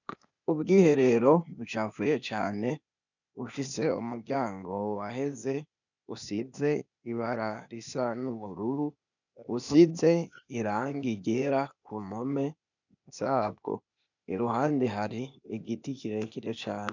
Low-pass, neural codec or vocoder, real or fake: 7.2 kHz; codec, 16 kHz, 0.8 kbps, ZipCodec; fake